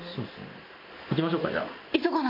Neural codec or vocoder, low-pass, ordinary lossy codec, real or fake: none; 5.4 kHz; MP3, 32 kbps; real